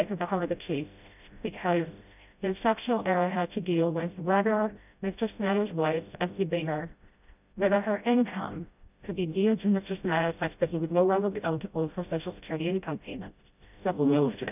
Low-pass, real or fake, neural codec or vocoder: 3.6 kHz; fake; codec, 16 kHz, 0.5 kbps, FreqCodec, smaller model